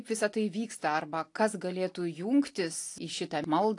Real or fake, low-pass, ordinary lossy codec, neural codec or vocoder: real; 10.8 kHz; AAC, 48 kbps; none